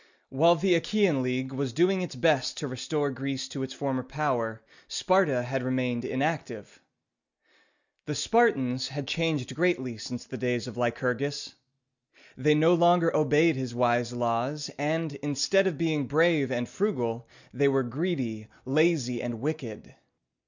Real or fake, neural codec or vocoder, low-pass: real; none; 7.2 kHz